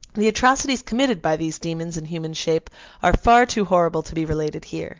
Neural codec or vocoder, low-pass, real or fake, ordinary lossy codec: none; 7.2 kHz; real; Opus, 32 kbps